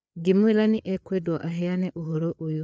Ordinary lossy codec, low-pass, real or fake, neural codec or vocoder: none; none; fake; codec, 16 kHz, 4 kbps, FreqCodec, larger model